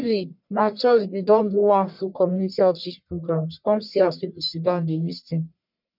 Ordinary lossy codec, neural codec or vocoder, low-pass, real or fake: none; codec, 44.1 kHz, 1.7 kbps, Pupu-Codec; 5.4 kHz; fake